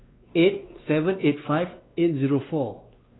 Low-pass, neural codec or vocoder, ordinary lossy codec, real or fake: 7.2 kHz; codec, 16 kHz, 2 kbps, X-Codec, WavLM features, trained on Multilingual LibriSpeech; AAC, 16 kbps; fake